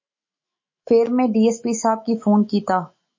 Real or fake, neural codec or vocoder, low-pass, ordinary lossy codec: fake; autoencoder, 48 kHz, 128 numbers a frame, DAC-VAE, trained on Japanese speech; 7.2 kHz; MP3, 32 kbps